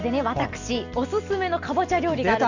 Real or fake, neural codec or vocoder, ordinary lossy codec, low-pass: real; none; none; 7.2 kHz